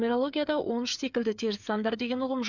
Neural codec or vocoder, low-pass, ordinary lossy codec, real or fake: codec, 16 kHz, 8 kbps, FreqCodec, smaller model; 7.2 kHz; none; fake